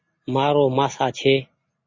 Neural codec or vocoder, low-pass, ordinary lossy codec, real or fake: none; 7.2 kHz; MP3, 32 kbps; real